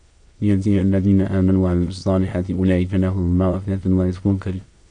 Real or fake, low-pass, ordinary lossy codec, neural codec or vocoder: fake; 9.9 kHz; AAC, 64 kbps; autoencoder, 22.05 kHz, a latent of 192 numbers a frame, VITS, trained on many speakers